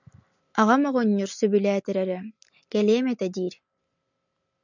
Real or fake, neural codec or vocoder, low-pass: real; none; 7.2 kHz